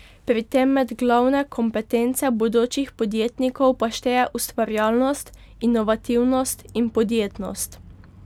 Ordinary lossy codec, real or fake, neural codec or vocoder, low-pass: none; real; none; 19.8 kHz